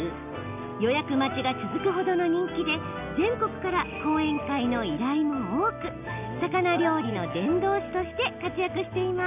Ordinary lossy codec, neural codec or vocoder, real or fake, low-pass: none; none; real; 3.6 kHz